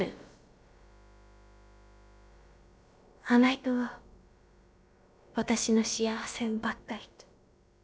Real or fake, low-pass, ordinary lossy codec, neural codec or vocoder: fake; none; none; codec, 16 kHz, about 1 kbps, DyCAST, with the encoder's durations